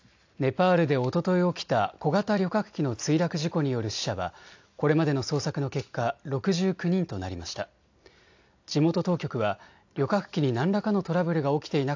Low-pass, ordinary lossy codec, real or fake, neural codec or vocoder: 7.2 kHz; AAC, 48 kbps; real; none